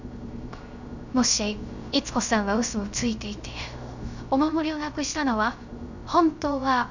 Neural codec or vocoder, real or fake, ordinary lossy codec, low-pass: codec, 16 kHz, 0.3 kbps, FocalCodec; fake; none; 7.2 kHz